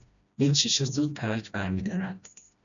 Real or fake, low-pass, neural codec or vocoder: fake; 7.2 kHz; codec, 16 kHz, 1 kbps, FreqCodec, smaller model